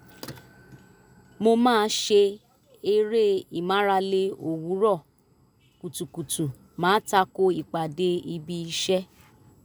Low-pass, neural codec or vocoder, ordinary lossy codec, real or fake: none; none; none; real